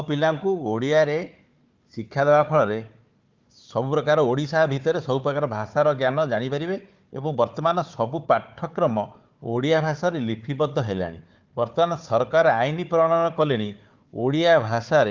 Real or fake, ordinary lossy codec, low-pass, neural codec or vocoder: fake; Opus, 24 kbps; 7.2 kHz; codec, 16 kHz, 4 kbps, FunCodec, trained on Chinese and English, 50 frames a second